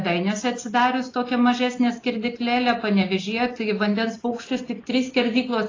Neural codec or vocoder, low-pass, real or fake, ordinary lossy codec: none; 7.2 kHz; real; AAC, 32 kbps